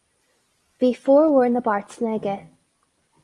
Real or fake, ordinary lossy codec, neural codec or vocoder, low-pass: real; Opus, 32 kbps; none; 10.8 kHz